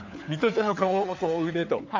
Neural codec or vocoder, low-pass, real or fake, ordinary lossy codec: codec, 16 kHz, 4 kbps, FunCodec, trained on LibriTTS, 50 frames a second; 7.2 kHz; fake; MP3, 64 kbps